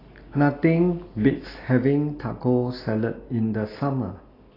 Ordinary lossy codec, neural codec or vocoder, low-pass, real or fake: AAC, 24 kbps; none; 5.4 kHz; real